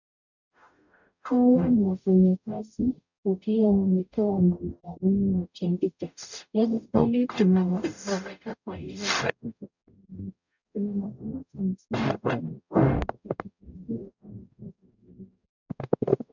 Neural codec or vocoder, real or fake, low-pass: codec, 44.1 kHz, 0.9 kbps, DAC; fake; 7.2 kHz